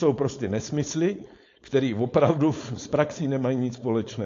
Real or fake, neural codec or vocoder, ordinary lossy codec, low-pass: fake; codec, 16 kHz, 4.8 kbps, FACodec; AAC, 48 kbps; 7.2 kHz